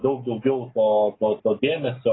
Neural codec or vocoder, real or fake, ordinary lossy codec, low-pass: none; real; AAC, 16 kbps; 7.2 kHz